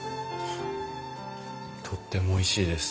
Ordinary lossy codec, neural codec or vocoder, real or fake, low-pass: none; none; real; none